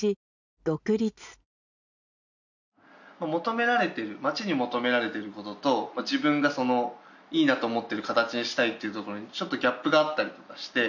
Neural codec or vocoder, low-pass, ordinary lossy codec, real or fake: none; 7.2 kHz; none; real